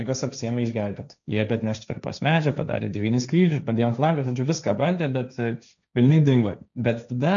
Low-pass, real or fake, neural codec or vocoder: 7.2 kHz; fake; codec, 16 kHz, 1.1 kbps, Voila-Tokenizer